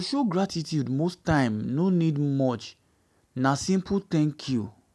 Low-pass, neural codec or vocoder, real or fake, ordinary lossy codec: none; none; real; none